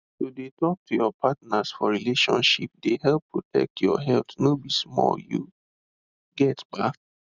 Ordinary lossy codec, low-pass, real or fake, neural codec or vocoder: none; 7.2 kHz; real; none